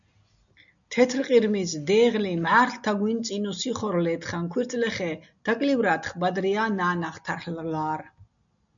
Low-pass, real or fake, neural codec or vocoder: 7.2 kHz; real; none